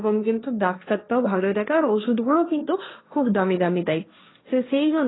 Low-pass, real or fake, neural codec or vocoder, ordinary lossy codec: 7.2 kHz; fake; codec, 16 kHz, 1.1 kbps, Voila-Tokenizer; AAC, 16 kbps